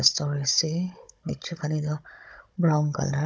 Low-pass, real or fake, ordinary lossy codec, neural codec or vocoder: none; fake; none; codec, 16 kHz, 16 kbps, FunCodec, trained on Chinese and English, 50 frames a second